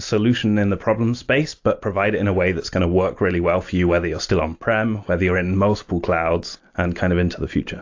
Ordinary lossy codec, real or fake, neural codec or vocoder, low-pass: AAC, 48 kbps; real; none; 7.2 kHz